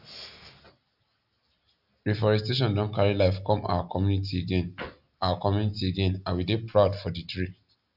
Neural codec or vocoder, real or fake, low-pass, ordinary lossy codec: none; real; 5.4 kHz; none